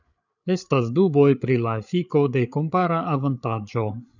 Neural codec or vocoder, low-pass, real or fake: codec, 16 kHz, 8 kbps, FreqCodec, larger model; 7.2 kHz; fake